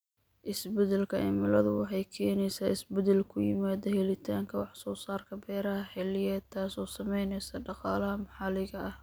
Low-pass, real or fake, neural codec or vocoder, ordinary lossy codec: none; real; none; none